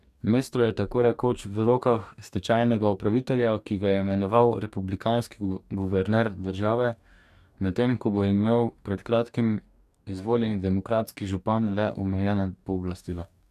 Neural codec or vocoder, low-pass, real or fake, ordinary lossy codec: codec, 44.1 kHz, 2.6 kbps, DAC; 14.4 kHz; fake; none